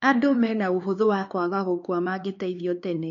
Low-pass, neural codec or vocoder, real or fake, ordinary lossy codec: 7.2 kHz; codec, 16 kHz, 4 kbps, X-Codec, HuBERT features, trained on LibriSpeech; fake; MP3, 48 kbps